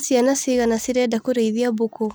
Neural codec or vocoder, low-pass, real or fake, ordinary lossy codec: none; none; real; none